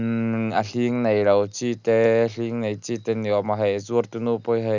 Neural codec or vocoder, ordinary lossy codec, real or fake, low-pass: none; none; real; 7.2 kHz